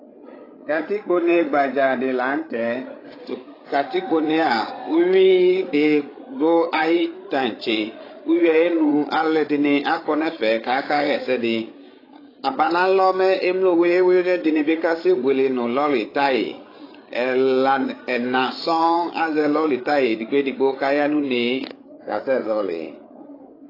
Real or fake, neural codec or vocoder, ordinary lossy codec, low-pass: fake; codec, 16 kHz, 8 kbps, FreqCodec, larger model; AAC, 24 kbps; 5.4 kHz